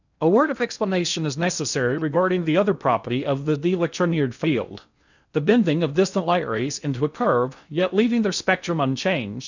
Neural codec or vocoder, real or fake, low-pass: codec, 16 kHz in and 24 kHz out, 0.6 kbps, FocalCodec, streaming, 2048 codes; fake; 7.2 kHz